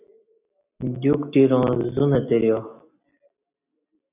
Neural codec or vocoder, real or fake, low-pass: none; real; 3.6 kHz